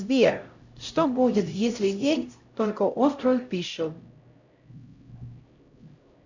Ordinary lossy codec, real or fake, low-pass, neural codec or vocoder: Opus, 64 kbps; fake; 7.2 kHz; codec, 16 kHz, 0.5 kbps, X-Codec, HuBERT features, trained on LibriSpeech